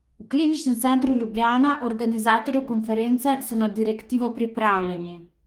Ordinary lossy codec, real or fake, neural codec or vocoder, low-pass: Opus, 32 kbps; fake; codec, 44.1 kHz, 2.6 kbps, DAC; 19.8 kHz